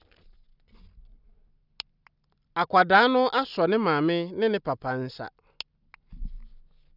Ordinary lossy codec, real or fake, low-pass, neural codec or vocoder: none; real; 5.4 kHz; none